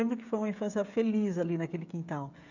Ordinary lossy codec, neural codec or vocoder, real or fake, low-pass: none; codec, 16 kHz, 16 kbps, FreqCodec, smaller model; fake; 7.2 kHz